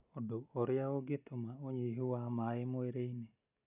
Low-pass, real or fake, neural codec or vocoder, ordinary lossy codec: 3.6 kHz; real; none; none